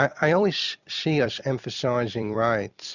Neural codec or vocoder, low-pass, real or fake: vocoder, 44.1 kHz, 128 mel bands every 256 samples, BigVGAN v2; 7.2 kHz; fake